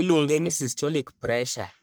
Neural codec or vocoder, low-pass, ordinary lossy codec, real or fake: codec, 44.1 kHz, 1.7 kbps, Pupu-Codec; none; none; fake